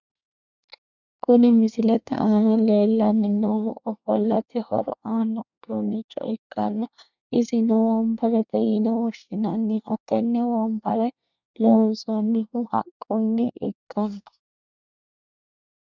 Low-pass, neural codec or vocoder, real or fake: 7.2 kHz; codec, 24 kHz, 1 kbps, SNAC; fake